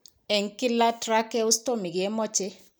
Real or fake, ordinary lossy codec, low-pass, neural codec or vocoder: real; none; none; none